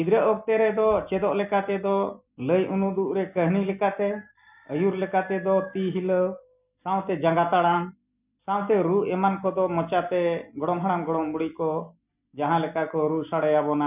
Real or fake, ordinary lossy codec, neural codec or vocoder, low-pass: real; none; none; 3.6 kHz